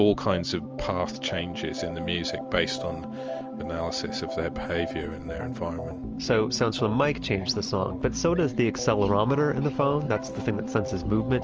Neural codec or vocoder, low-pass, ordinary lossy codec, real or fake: none; 7.2 kHz; Opus, 24 kbps; real